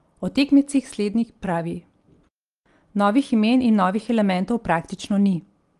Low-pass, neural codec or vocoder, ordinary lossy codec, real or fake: 10.8 kHz; none; Opus, 24 kbps; real